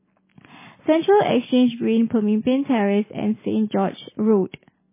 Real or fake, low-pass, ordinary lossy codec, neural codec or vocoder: real; 3.6 kHz; MP3, 16 kbps; none